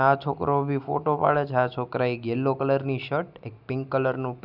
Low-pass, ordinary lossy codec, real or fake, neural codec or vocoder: 5.4 kHz; none; real; none